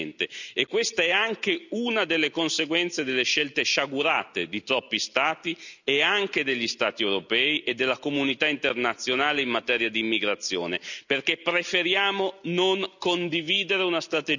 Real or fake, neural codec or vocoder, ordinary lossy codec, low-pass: real; none; none; 7.2 kHz